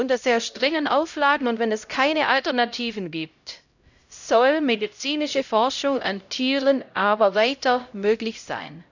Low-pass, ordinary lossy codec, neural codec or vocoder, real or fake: 7.2 kHz; none; codec, 16 kHz, 0.5 kbps, X-Codec, HuBERT features, trained on LibriSpeech; fake